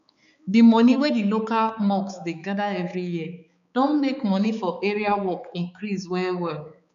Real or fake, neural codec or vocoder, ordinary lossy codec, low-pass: fake; codec, 16 kHz, 4 kbps, X-Codec, HuBERT features, trained on balanced general audio; none; 7.2 kHz